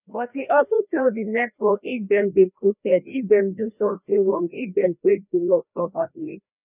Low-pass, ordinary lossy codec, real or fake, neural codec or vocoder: 3.6 kHz; none; fake; codec, 16 kHz, 1 kbps, FreqCodec, larger model